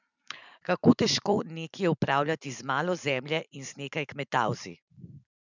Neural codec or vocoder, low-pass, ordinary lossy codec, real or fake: vocoder, 44.1 kHz, 128 mel bands every 512 samples, BigVGAN v2; 7.2 kHz; none; fake